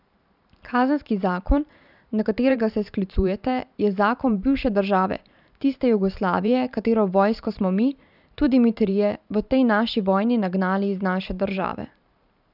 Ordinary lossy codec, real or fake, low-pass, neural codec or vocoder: none; fake; 5.4 kHz; vocoder, 44.1 kHz, 128 mel bands every 512 samples, BigVGAN v2